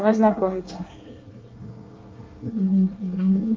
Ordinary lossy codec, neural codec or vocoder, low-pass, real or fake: Opus, 24 kbps; codec, 24 kHz, 1 kbps, SNAC; 7.2 kHz; fake